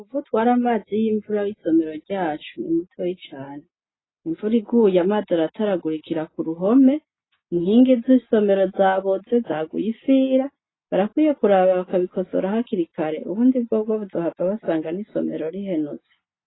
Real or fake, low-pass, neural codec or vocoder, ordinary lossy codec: real; 7.2 kHz; none; AAC, 16 kbps